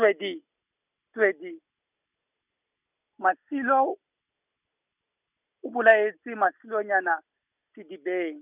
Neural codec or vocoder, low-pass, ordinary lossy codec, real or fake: none; 3.6 kHz; none; real